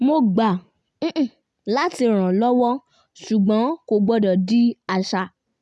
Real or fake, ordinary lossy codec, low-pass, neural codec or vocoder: real; none; none; none